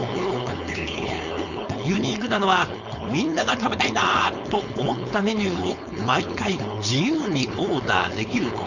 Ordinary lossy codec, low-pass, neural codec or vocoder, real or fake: none; 7.2 kHz; codec, 16 kHz, 4.8 kbps, FACodec; fake